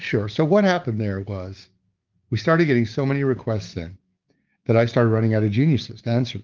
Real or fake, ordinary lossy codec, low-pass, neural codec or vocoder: fake; Opus, 32 kbps; 7.2 kHz; codec, 16 kHz, 6 kbps, DAC